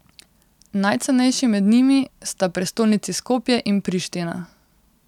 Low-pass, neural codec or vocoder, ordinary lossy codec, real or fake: 19.8 kHz; none; none; real